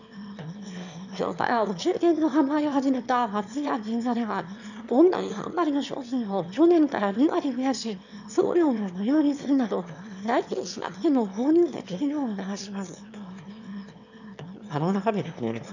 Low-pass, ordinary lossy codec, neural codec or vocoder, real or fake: 7.2 kHz; none; autoencoder, 22.05 kHz, a latent of 192 numbers a frame, VITS, trained on one speaker; fake